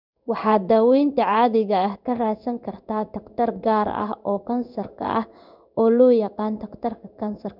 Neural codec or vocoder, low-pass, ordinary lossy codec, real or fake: codec, 16 kHz in and 24 kHz out, 1 kbps, XY-Tokenizer; 5.4 kHz; none; fake